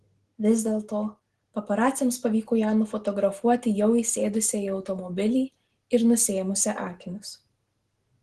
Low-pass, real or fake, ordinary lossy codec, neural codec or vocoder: 10.8 kHz; real; Opus, 16 kbps; none